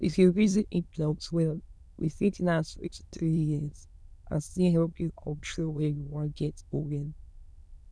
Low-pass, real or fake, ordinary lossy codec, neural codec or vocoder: none; fake; none; autoencoder, 22.05 kHz, a latent of 192 numbers a frame, VITS, trained on many speakers